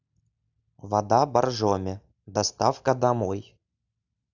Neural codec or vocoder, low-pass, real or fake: none; 7.2 kHz; real